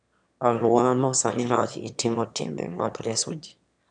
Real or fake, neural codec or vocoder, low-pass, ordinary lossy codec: fake; autoencoder, 22.05 kHz, a latent of 192 numbers a frame, VITS, trained on one speaker; 9.9 kHz; none